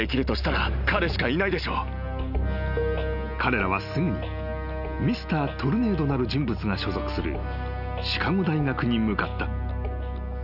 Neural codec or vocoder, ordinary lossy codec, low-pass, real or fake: none; none; 5.4 kHz; real